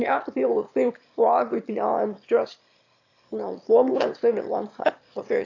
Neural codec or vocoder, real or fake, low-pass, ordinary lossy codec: autoencoder, 22.05 kHz, a latent of 192 numbers a frame, VITS, trained on one speaker; fake; 7.2 kHz; AAC, 48 kbps